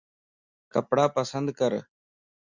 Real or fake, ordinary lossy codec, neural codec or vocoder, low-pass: real; Opus, 64 kbps; none; 7.2 kHz